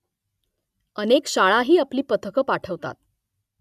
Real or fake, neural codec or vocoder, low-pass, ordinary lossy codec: real; none; 14.4 kHz; none